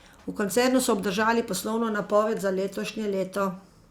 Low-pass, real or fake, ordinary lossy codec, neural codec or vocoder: 19.8 kHz; fake; Opus, 64 kbps; vocoder, 44.1 kHz, 128 mel bands every 256 samples, BigVGAN v2